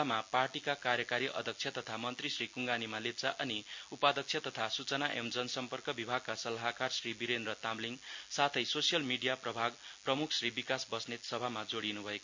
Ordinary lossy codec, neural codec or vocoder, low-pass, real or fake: MP3, 48 kbps; none; 7.2 kHz; real